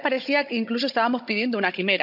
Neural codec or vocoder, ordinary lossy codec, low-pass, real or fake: codec, 16 kHz, 16 kbps, FunCodec, trained on Chinese and English, 50 frames a second; none; 5.4 kHz; fake